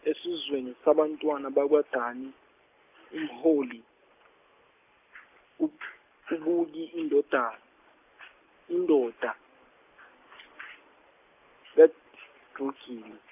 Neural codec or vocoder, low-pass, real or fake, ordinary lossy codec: none; 3.6 kHz; real; none